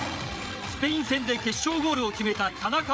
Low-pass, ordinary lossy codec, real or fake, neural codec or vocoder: none; none; fake; codec, 16 kHz, 8 kbps, FreqCodec, larger model